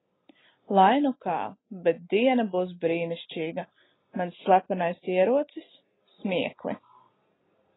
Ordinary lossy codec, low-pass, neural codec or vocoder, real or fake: AAC, 16 kbps; 7.2 kHz; none; real